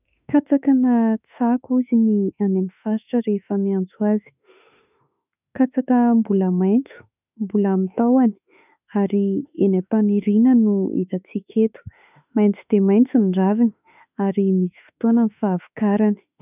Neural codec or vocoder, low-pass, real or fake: autoencoder, 48 kHz, 32 numbers a frame, DAC-VAE, trained on Japanese speech; 3.6 kHz; fake